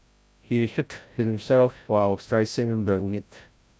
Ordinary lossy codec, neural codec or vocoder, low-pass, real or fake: none; codec, 16 kHz, 0.5 kbps, FreqCodec, larger model; none; fake